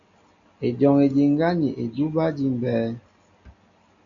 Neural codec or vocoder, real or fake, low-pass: none; real; 7.2 kHz